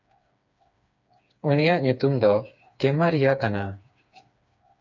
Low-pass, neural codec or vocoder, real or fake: 7.2 kHz; codec, 16 kHz, 4 kbps, FreqCodec, smaller model; fake